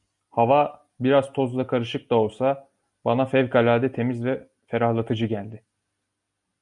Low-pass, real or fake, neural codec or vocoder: 10.8 kHz; real; none